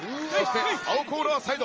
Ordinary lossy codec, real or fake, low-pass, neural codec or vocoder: Opus, 24 kbps; real; 7.2 kHz; none